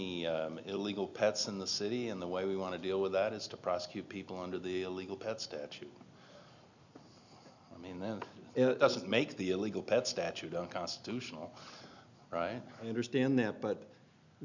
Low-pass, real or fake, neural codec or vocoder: 7.2 kHz; real; none